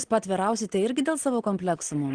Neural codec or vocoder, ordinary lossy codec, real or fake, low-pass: none; Opus, 16 kbps; real; 9.9 kHz